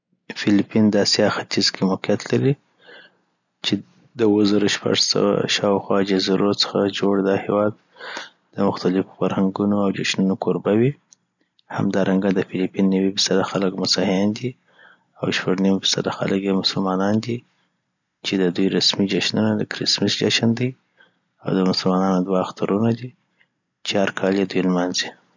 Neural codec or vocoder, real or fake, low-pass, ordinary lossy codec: none; real; 7.2 kHz; none